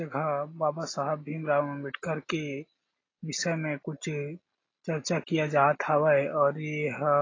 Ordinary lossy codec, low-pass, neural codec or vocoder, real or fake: AAC, 32 kbps; 7.2 kHz; none; real